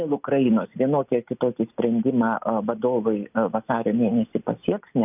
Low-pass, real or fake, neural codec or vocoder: 3.6 kHz; real; none